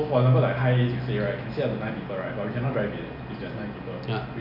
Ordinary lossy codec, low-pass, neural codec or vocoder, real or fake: none; 5.4 kHz; none; real